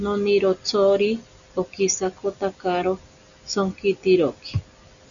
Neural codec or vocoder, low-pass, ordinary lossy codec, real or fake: none; 7.2 kHz; MP3, 96 kbps; real